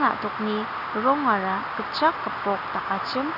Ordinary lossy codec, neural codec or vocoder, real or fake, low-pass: none; none; real; 5.4 kHz